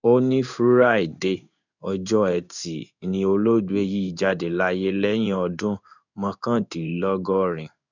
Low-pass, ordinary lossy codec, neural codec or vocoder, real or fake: 7.2 kHz; none; codec, 16 kHz in and 24 kHz out, 1 kbps, XY-Tokenizer; fake